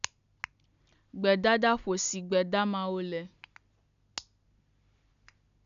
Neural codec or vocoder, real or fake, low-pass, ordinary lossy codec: none; real; 7.2 kHz; none